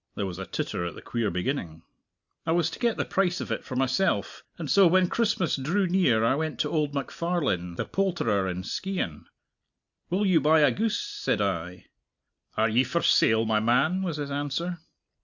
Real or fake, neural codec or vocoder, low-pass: real; none; 7.2 kHz